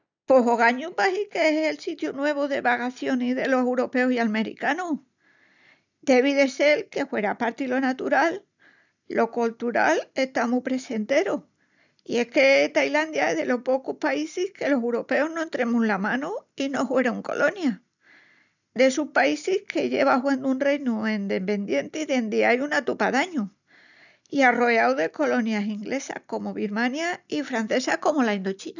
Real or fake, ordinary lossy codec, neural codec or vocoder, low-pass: real; none; none; 7.2 kHz